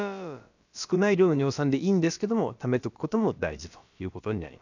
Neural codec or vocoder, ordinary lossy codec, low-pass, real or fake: codec, 16 kHz, about 1 kbps, DyCAST, with the encoder's durations; none; 7.2 kHz; fake